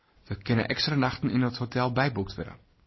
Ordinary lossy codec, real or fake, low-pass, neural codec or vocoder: MP3, 24 kbps; real; 7.2 kHz; none